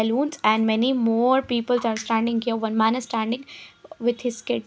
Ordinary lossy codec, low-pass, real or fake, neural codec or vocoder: none; none; real; none